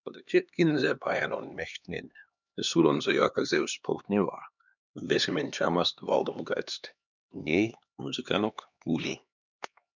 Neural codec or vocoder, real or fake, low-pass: codec, 16 kHz, 2 kbps, X-Codec, HuBERT features, trained on LibriSpeech; fake; 7.2 kHz